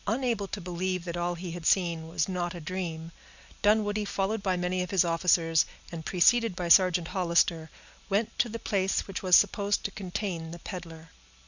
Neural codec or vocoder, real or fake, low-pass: none; real; 7.2 kHz